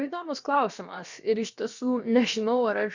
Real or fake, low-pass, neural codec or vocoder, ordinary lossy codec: fake; 7.2 kHz; codec, 16 kHz, about 1 kbps, DyCAST, with the encoder's durations; Opus, 64 kbps